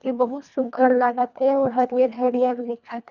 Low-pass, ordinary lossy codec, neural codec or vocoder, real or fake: 7.2 kHz; none; codec, 24 kHz, 1.5 kbps, HILCodec; fake